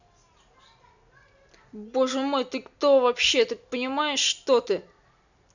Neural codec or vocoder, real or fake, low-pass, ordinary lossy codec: none; real; 7.2 kHz; none